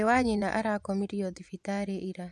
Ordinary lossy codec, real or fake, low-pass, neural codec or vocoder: none; fake; none; vocoder, 24 kHz, 100 mel bands, Vocos